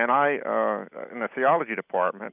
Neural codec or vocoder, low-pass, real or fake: none; 3.6 kHz; real